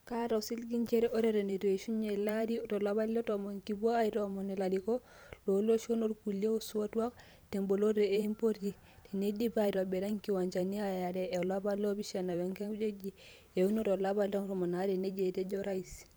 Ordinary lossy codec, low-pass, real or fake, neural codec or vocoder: none; none; fake; vocoder, 44.1 kHz, 128 mel bands every 512 samples, BigVGAN v2